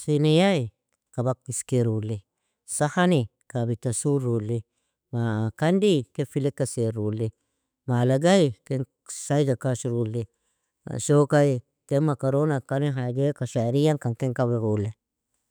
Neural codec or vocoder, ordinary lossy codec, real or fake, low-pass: autoencoder, 48 kHz, 128 numbers a frame, DAC-VAE, trained on Japanese speech; none; fake; none